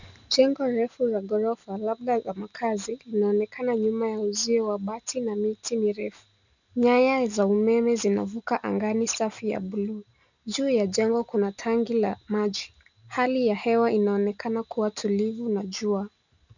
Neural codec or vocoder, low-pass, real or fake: none; 7.2 kHz; real